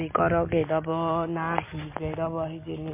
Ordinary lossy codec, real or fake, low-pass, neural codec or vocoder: MP3, 24 kbps; real; 3.6 kHz; none